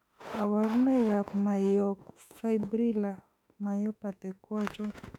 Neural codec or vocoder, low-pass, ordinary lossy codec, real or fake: autoencoder, 48 kHz, 32 numbers a frame, DAC-VAE, trained on Japanese speech; 19.8 kHz; none; fake